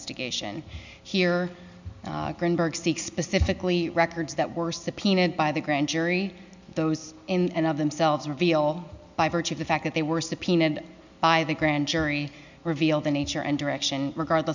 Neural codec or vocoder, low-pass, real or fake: none; 7.2 kHz; real